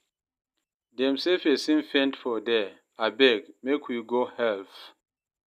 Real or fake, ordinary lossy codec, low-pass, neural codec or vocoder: real; none; 14.4 kHz; none